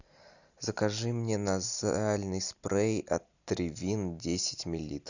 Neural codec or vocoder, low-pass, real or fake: none; 7.2 kHz; real